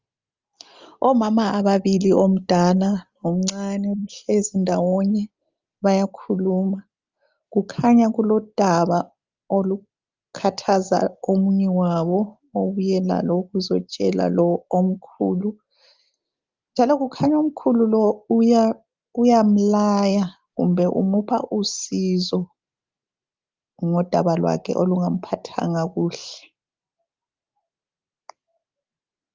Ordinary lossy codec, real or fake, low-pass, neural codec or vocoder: Opus, 32 kbps; real; 7.2 kHz; none